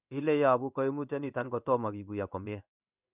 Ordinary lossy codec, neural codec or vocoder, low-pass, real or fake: none; codec, 16 kHz in and 24 kHz out, 1 kbps, XY-Tokenizer; 3.6 kHz; fake